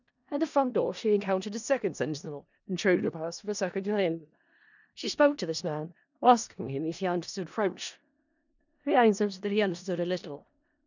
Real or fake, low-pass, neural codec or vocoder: fake; 7.2 kHz; codec, 16 kHz in and 24 kHz out, 0.4 kbps, LongCat-Audio-Codec, four codebook decoder